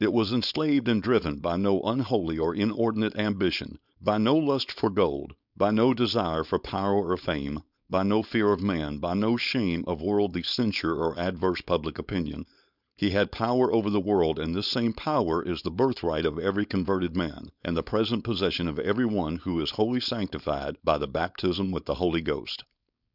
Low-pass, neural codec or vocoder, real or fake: 5.4 kHz; codec, 16 kHz, 4.8 kbps, FACodec; fake